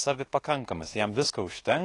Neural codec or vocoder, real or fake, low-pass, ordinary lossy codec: codec, 24 kHz, 1.2 kbps, DualCodec; fake; 10.8 kHz; AAC, 32 kbps